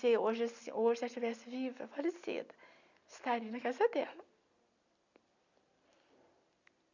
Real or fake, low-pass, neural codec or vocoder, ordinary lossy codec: real; 7.2 kHz; none; none